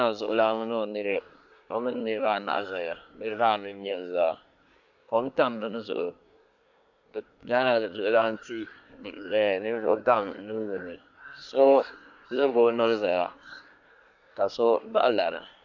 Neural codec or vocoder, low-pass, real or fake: codec, 24 kHz, 1 kbps, SNAC; 7.2 kHz; fake